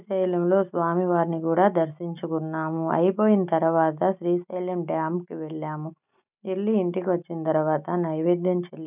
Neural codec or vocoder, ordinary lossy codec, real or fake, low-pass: none; none; real; 3.6 kHz